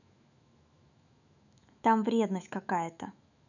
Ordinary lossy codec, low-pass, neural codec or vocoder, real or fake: none; 7.2 kHz; autoencoder, 48 kHz, 128 numbers a frame, DAC-VAE, trained on Japanese speech; fake